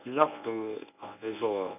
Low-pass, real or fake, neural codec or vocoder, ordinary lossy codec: 3.6 kHz; fake; codec, 24 kHz, 0.9 kbps, WavTokenizer, medium speech release version 1; none